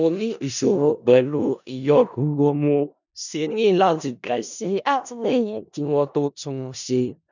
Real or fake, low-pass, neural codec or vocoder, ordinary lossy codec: fake; 7.2 kHz; codec, 16 kHz in and 24 kHz out, 0.4 kbps, LongCat-Audio-Codec, four codebook decoder; none